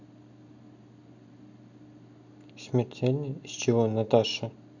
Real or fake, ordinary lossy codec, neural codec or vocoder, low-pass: real; none; none; 7.2 kHz